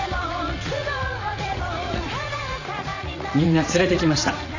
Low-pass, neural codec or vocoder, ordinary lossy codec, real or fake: 7.2 kHz; vocoder, 44.1 kHz, 80 mel bands, Vocos; AAC, 48 kbps; fake